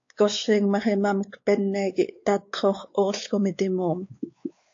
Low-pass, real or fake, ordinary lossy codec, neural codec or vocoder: 7.2 kHz; fake; AAC, 48 kbps; codec, 16 kHz, 4 kbps, X-Codec, WavLM features, trained on Multilingual LibriSpeech